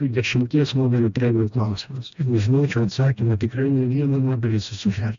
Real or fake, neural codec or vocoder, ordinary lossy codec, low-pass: fake; codec, 16 kHz, 1 kbps, FreqCodec, smaller model; MP3, 64 kbps; 7.2 kHz